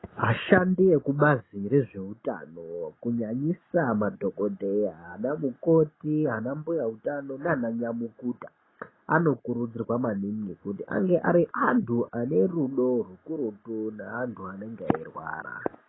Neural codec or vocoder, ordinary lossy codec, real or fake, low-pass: none; AAC, 16 kbps; real; 7.2 kHz